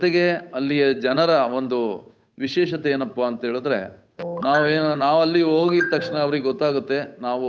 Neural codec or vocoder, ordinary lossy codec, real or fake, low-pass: codec, 16 kHz, 8 kbps, FunCodec, trained on Chinese and English, 25 frames a second; none; fake; none